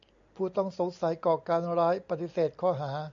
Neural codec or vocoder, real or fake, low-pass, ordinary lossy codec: none; real; 7.2 kHz; AAC, 64 kbps